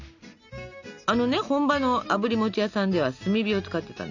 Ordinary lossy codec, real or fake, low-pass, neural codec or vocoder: none; real; 7.2 kHz; none